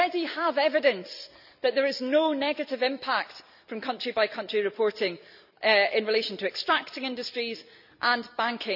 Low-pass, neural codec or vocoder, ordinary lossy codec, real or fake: 5.4 kHz; none; none; real